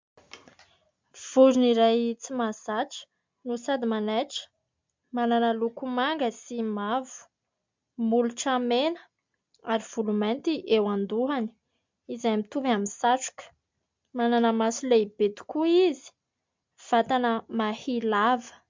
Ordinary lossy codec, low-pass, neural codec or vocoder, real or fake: MP3, 64 kbps; 7.2 kHz; none; real